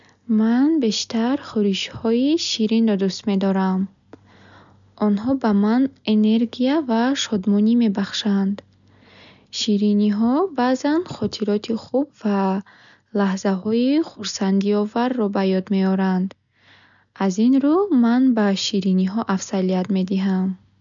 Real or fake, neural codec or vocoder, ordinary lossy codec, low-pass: real; none; none; 7.2 kHz